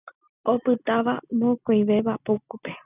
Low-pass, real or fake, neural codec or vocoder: 3.6 kHz; real; none